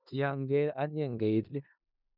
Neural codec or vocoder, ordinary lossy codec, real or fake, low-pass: codec, 16 kHz in and 24 kHz out, 0.4 kbps, LongCat-Audio-Codec, four codebook decoder; none; fake; 5.4 kHz